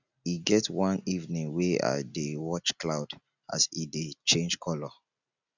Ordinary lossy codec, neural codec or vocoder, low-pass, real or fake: none; none; 7.2 kHz; real